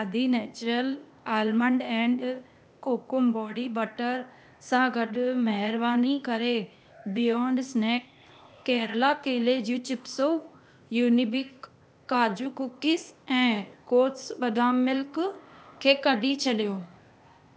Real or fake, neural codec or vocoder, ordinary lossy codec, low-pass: fake; codec, 16 kHz, 0.8 kbps, ZipCodec; none; none